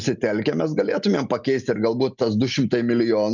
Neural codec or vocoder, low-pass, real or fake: none; 7.2 kHz; real